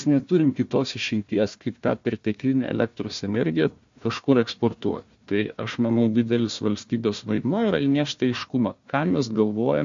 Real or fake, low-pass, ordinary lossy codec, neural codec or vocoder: fake; 7.2 kHz; MP3, 48 kbps; codec, 16 kHz, 1 kbps, FunCodec, trained on Chinese and English, 50 frames a second